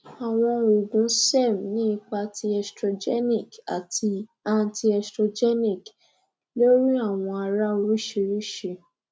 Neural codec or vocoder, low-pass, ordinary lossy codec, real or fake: none; none; none; real